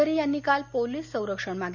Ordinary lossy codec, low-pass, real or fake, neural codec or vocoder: none; 7.2 kHz; real; none